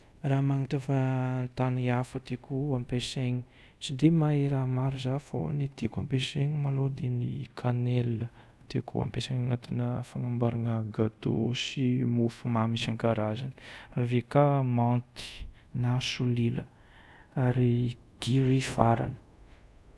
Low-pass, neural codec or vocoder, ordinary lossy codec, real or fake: none; codec, 24 kHz, 0.5 kbps, DualCodec; none; fake